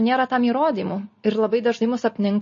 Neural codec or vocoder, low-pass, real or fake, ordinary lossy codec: none; 7.2 kHz; real; MP3, 32 kbps